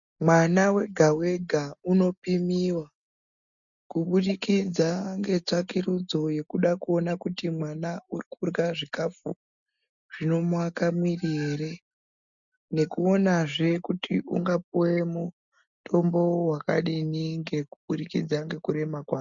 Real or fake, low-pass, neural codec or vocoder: real; 7.2 kHz; none